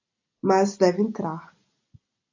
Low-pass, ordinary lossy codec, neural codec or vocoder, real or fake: 7.2 kHz; AAC, 48 kbps; none; real